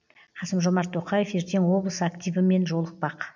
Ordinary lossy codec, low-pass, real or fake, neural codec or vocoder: none; 7.2 kHz; real; none